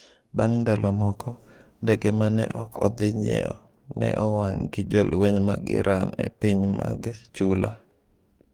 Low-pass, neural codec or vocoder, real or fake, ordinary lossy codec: 19.8 kHz; codec, 44.1 kHz, 2.6 kbps, DAC; fake; Opus, 32 kbps